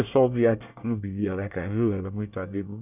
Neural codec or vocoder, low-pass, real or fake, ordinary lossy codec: codec, 24 kHz, 1 kbps, SNAC; 3.6 kHz; fake; none